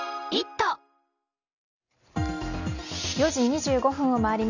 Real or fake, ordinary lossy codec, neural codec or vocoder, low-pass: real; none; none; 7.2 kHz